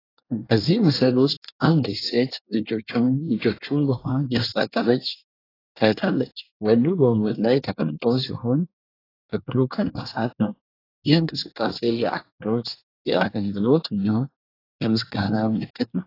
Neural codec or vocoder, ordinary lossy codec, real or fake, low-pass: codec, 24 kHz, 1 kbps, SNAC; AAC, 24 kbps; fake; 5.4 kHz